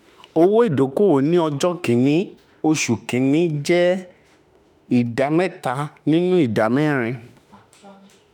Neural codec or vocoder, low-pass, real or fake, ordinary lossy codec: autoencoder, 48 kHz, 32 numbers a frame, DAC-VAE, trained on Japanese speech; 19.8 kHz; fake; none